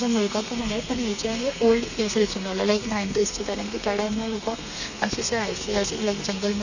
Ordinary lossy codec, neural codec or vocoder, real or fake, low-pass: none; codec, 32 kHz, 1.9 kbps, SNAC; fake; 7.2 kHz